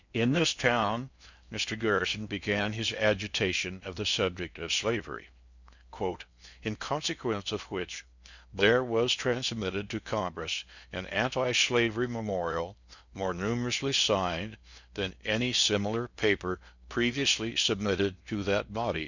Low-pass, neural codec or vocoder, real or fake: 7.2 kHz; codec, 16 kHz in and 24 kHz out, 0.6 kbps, FocalCodec, streaming, 2048 codes; fake